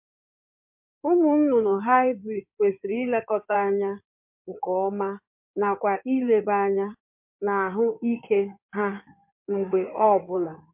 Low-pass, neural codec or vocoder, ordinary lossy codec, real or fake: 3.6 kHz; codec, 16 kHz in and 24 kHz out, 2.2 kbps, FireRedTTS-2 codec; MP3, 32 kbps; fake